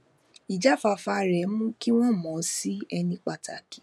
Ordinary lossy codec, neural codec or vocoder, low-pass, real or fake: none; vocoder, 24 kHz, 100 mel bands, Vocos; none; fake